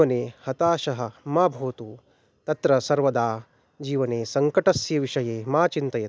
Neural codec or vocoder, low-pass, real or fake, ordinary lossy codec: none; none; real; none